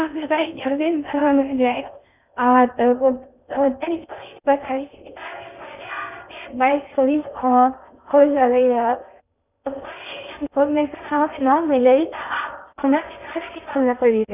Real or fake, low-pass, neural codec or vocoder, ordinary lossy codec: fake; 3.6 kHz; codec, 16 kHz in and 24 kHz out, 0.6 kbps, FocalCodec, streaming, 2048 codes; none